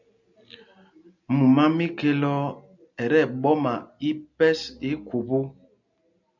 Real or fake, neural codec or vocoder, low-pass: real; none; 7.2 kHz